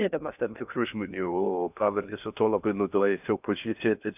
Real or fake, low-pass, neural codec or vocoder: fake; 3.6 kHz; codec, 16 kHz in and 24 kHz out, 0.6 kbps, FocalCodec, streaming, 2048 codes